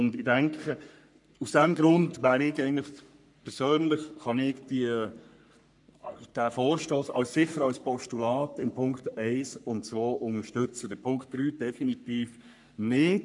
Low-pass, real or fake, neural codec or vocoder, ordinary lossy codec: 10.8 kHz; fake; codec, 44.1 kHz, 3.4 kbps, Pupu-Codec; none